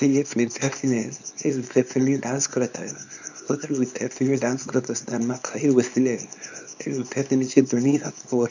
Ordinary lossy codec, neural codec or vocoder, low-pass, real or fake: none; codec, 24 kHz, 0.9 kbps, WavTokenizer, small release; 7.2 kHz; fake